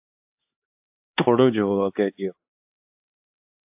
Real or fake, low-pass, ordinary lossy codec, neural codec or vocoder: fake; 3.6 kHz; AAC, 32 kbps; codec, 16 kHz, 4 kbps, X-Codec, HuBERT features, trained on LibriSpeech